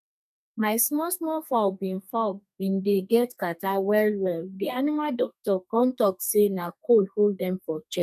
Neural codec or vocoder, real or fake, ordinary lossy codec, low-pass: codec, 32 kHz, 1.9 kbps, SNAC; fake; none; 14.4 kHz